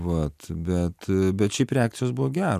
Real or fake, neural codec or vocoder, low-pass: real; none; 14.4 kHz